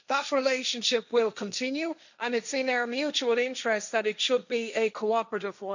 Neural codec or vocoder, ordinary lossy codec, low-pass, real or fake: codec, 16 kHz, 1.1 kbps, Voila-Tokenizer; none; 7.2 kHz; fake